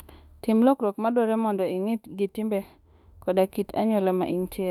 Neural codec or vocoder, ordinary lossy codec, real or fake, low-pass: autoencoder, 48 kHz, 32 numbers a frame, DAC-VAE, trained on Japanese speech; none; fake; 19.8 kHz